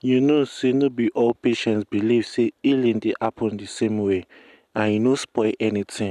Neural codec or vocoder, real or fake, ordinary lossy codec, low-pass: none; real; none; 14.4 kHz